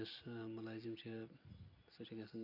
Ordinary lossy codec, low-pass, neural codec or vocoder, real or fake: none; 5.4 kHz; none; real